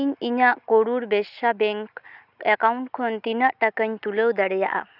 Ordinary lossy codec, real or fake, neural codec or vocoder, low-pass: none; real; none; 5.4 kHz